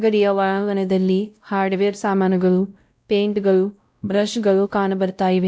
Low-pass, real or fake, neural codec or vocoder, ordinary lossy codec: none; fake; codec, 16 kHz, 0.5 kbps, X-Codec, WavLM features, trained on Multilingual LibriSpeech; none